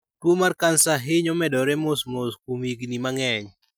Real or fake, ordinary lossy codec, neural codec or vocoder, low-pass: real; none; none; none